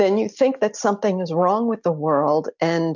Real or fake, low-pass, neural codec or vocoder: real; 7.2 kHz; none